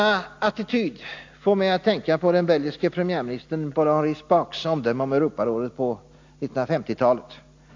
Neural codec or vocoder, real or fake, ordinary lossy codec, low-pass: none; real; AAC, 48 kbps; 7.2 kHz